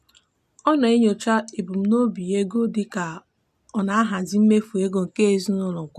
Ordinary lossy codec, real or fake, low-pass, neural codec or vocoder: none; real; 14.4 kHz; none